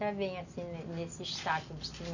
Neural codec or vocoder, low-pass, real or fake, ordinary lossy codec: none; 7.2 kHz; real; none